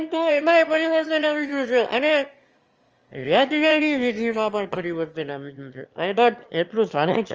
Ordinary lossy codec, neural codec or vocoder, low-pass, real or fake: Opus, 24 kbps; autoencoder, 22.05 kHz, a latent of 192 numbers a frame, VITS, trained on one speaker; 7.2 kHz; fake